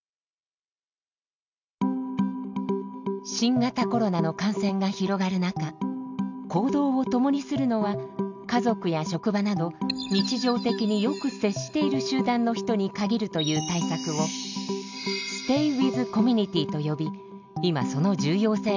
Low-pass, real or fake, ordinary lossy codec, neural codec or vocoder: 7.2 kHz; real; none; none